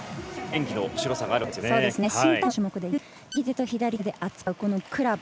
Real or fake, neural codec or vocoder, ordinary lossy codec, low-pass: real; none; none; none